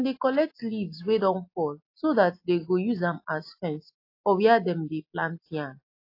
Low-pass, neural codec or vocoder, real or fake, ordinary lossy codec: 5.4 kHz; none; real; AAC, 32 kbps